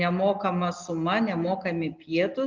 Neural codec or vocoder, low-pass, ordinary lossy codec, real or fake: none; 7.2 kHz; Opus, 24 kbps; real